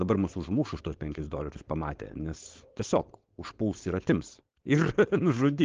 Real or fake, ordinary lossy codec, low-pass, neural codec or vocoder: fake; Opus, 16 kbps; 7.2 kHz; codec, 16 kHz, 4.8 kbps, FACodec